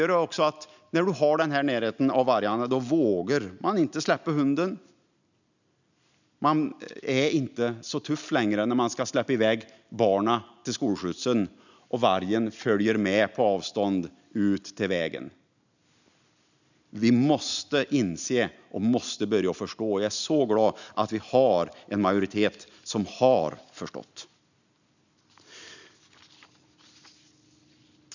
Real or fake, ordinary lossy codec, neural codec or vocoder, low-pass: real; none; none; 7.2 kHz